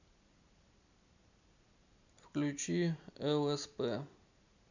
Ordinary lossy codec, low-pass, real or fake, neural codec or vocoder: none; 7.2 kHz; real; none